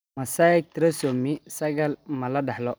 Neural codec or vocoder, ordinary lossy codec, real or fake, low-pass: none; none; real; none